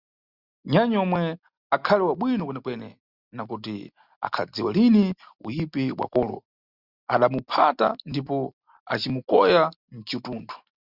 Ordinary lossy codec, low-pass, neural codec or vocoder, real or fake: Opus, 64 kbps; 5.4 kHz; none; real